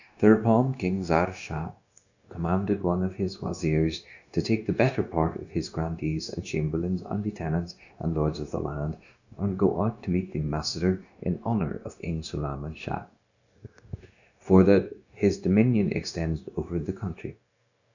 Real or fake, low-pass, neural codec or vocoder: fake; 7.2 kHz; codec, 16 kHz, 0.9 kbps, LongCat-Audio-Codec